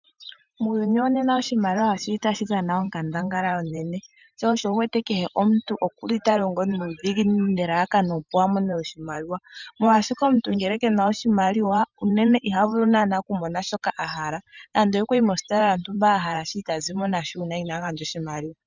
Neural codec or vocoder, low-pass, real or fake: vocoder, 44.1 kHz, 128 mel bands every 512 samples, BigVGAN v2; 7.2 kHz; fake